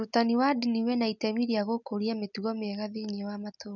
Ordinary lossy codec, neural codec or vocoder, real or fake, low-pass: none; none; real; 7.2 kHz